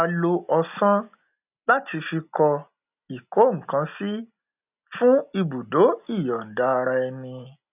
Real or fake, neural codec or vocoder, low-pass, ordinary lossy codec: real; none; 3.6 kHz; none